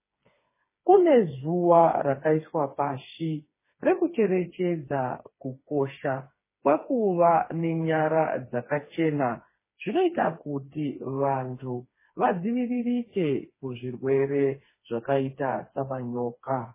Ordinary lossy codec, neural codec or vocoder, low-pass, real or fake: MP3, 16 kbps; codec, 16 kHz, 4 kbps, FreqCodec, smaller model; 3.6 kHz; fake